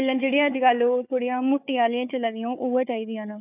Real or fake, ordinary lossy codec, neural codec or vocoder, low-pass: fake; none; codec, 16 kHz, 4 kbps, FunCodec, trained on Chinese and English, 50 frames a second; 3.6 kHz